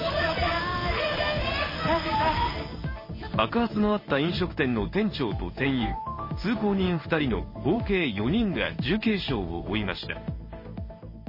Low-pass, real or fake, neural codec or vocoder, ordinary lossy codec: 5.4 kHz; fake; codec, 16 kHz in and 24 kHz out, 1 kbps, XY-Tokenizer; MP3, 24 kbps